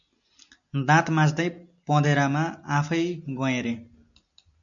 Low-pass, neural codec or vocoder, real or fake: 7.2 kHz; none; real